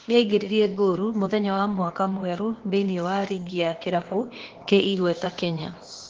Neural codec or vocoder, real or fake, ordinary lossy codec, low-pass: codec, 16 kHz, 0.8 kbps, ZipCodec; fake; Opus, 16 kbps; 7.2 kHz